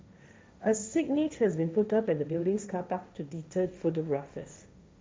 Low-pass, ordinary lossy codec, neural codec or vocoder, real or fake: none; none; codec, 16 kHz, 1.1 kbps, Voila-Tokenizer; fake